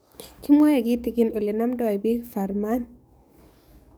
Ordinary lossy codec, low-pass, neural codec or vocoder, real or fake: none; none; codec, 44.1 kHz, 7.8 kbps, DAC; fake